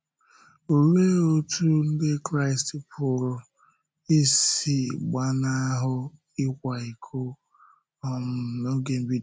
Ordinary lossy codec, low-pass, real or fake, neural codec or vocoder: none; none; real; none